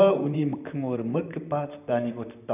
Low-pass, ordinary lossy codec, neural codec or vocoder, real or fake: 3.6 kHz; none; vocoder, 44.1 kHz, 128 mel bands, Pupu-Vocoder; fake